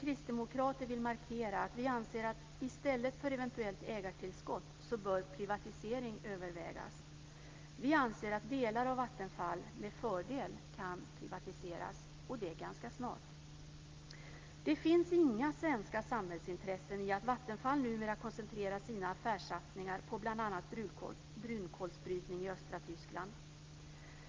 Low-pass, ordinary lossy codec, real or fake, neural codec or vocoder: 7.2 kHz; Opus, 32 kbps; real; none